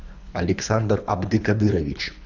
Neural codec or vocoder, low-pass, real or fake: codec, 24 kHz, 3 kbps, HILCodec; 7.2 kHz; fake